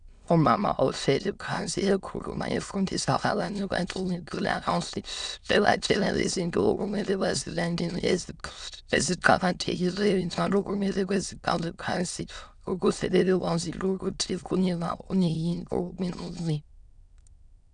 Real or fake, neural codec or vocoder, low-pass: fake; autoencoder, 22.05 kHz, a latent of 192 numbers a frame, VITS, trained on many speakers; 9.9 kHz